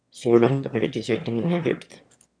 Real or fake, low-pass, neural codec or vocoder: fake; 9.9 kHz; autoencoder, 22.05 kHz, a latent of 192 numbers a frame, VITS, trained on one speaker